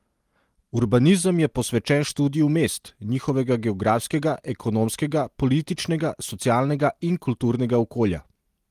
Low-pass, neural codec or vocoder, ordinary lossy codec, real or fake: 14.4 kHz; none; Opus, 24 kbps; real